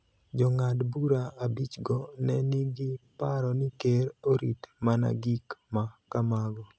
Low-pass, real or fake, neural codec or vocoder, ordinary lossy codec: none; real; none; none